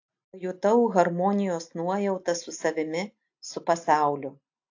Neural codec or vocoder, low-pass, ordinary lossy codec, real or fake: none; 7.2 kHz; AAC, 48 kbps; real